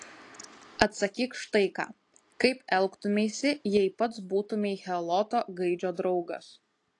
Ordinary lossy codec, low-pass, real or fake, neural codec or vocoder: AAC, 48 kbps; 10.8 kHz; real; none